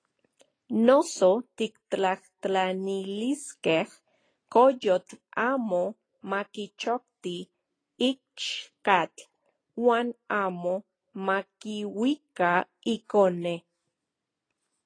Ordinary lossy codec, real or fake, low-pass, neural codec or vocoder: AAC, 32 kbps; real; 9.9 kHz; none